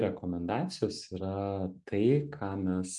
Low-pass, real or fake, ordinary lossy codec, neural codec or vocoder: 10.8 kHz; real; MP3, 96 kbps; none